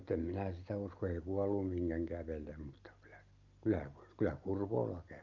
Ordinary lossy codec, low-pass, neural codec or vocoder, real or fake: Opus, 24 kbps; 7.2 kHz; none; real